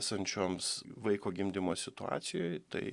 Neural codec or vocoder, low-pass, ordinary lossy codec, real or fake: none; 10.8 kHz; Opus, 64 kbps; real